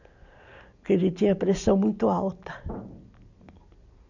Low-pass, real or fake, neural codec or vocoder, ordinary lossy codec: 7.2 kHz; real; none; none